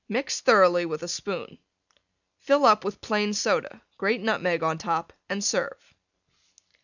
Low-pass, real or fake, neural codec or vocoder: 7.2 kHz; real; none